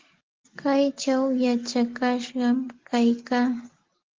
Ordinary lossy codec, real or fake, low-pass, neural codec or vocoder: Opus, 16 kbps; real; 7.2 kHz; none